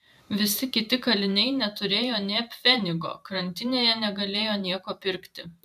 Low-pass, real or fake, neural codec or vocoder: 14.4 kHz; fake; vocoder, 44.1 kHz, 128 mel bands every 512 samples, BigVGAN v2